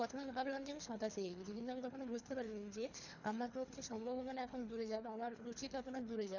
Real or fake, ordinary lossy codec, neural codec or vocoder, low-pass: fake; none; codec, 24 kHz, 3 kbps, HILCodec; 7.2 kHz